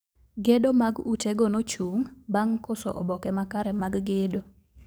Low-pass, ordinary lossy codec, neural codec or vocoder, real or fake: none; none; codec, 44.1 kHz, 7.8 kbps, DAC; fake